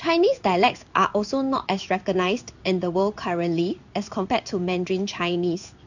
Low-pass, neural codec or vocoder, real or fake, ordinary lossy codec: 7.2 kHz; codec, 16 kHz in and 24 kHz out, 1 kbps, XY-Tokenizer; fake; none